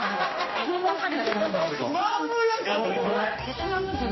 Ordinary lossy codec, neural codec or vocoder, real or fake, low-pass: MP3, 24 kbps; codec, 16 kHz, 1 kbps, X-Codec, HuBERT features, trained on general audio; fake; 7.2 kHz